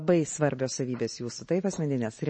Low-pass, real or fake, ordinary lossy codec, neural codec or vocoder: 9.9 kHz; real; MP3, 32 kbps; none